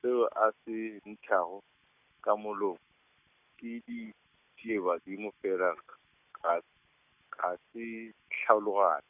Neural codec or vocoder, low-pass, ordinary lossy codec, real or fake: none; 3.6 kHz; none; real